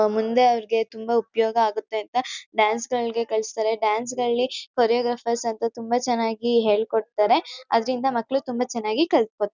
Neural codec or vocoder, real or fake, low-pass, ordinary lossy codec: none; real; 7.2 kHz; none